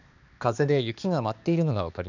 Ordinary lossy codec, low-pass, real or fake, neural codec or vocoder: none; 7.2 kHz; fake; codec, 16 kHz, 2 kbps, X-Codec, HuBERT features, trained on balanced general audio